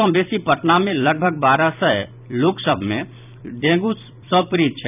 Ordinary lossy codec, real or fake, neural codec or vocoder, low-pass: none; fake; vocoder, 44.1 kHz, 128 mel bands every 256 samples, BigVGAN v2; 3.6 kHz